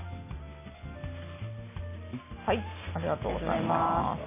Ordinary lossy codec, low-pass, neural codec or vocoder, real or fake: MP3, 16 kbps; 3.6 kHz; none; real